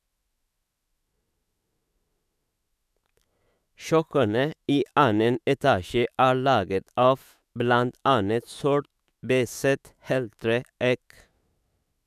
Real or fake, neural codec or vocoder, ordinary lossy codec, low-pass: fake; autoencoder, 48 kHz, 128 numbers a frame, DAC-VAE, trained on Japanese speech; none; 14.4 kHz